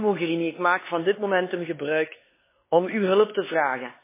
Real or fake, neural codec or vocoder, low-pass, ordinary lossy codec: fake; codec, 16 kHz, 4 kbps, X-Codec, HuBERT features, trained on LibriSpeech; 3.6 kHz; MP3, 16 kbps